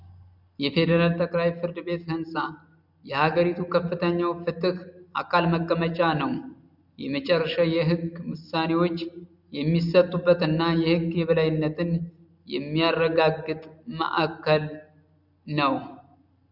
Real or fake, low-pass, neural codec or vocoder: real; 5.4 kHz; none